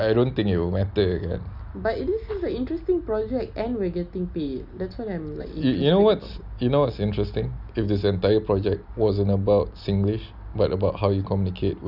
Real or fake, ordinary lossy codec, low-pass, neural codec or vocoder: real; none; 5.4 kHz; none